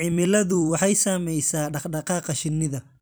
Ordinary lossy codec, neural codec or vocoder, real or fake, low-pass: none; vocoder, 44.1 kHz, 128 mel bands every 256 samples, BigVGAN v2; fake; none